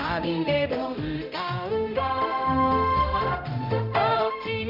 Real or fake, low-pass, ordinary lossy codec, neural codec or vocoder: fake; 5.4 kHz; none; codec, 16 kHz, 0.5 kbps, X-Codec, HuBERT features, trained on general audio